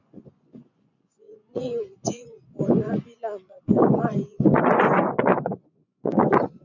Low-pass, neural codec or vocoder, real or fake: 7.2 kHz; vocoder, 24 kHz, 100 mel bands, Vocos; fake